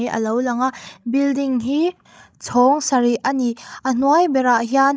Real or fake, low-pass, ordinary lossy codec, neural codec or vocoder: fake; none; none; codec, 16 kHz, 16 kbps, FreqCodec, larger model